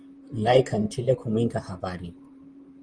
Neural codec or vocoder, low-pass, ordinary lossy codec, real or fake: vocoder, 44.1 kHz, 128 mel bands, Pupu-Vocoder; 9.9 kHz; Opus, 32 kbps; fake